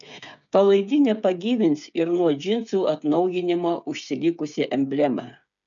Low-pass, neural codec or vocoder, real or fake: 7.2 kHz; codec, 16 kHz, 8 kbps, FreqCodec, smaller model; fake